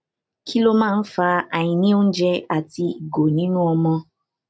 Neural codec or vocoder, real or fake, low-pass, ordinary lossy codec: none; real; none; none